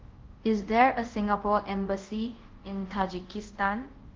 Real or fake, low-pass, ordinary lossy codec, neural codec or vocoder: fake; 7.2 kHz; Opus, 16 kbps; codec, 24 kHz, 0.5 kbps, DualCodec